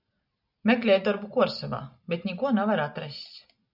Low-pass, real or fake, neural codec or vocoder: 5.4 kHz; real; none